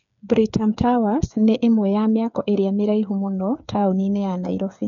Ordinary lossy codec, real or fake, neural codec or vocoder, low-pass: none; fake; codec, 16 kHz, 8 kbps, FreqCodec, smaller model; 7.2 kHz